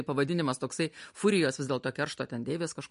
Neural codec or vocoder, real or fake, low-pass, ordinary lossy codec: none; real; 10.8 kHz; MP3, 48 kbps